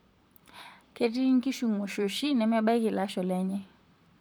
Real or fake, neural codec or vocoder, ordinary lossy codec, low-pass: fake; vocoder, 44.1 kHz, 128 mel bands, Pupu-Vocoder; none; none